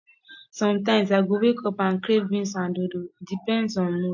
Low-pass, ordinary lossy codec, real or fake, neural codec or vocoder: 7.2 kHz; MP3, 48 kbps; real; none